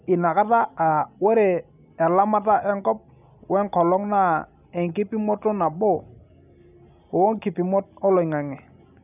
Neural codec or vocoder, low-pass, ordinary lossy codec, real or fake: none; 3.6 kHz; none; real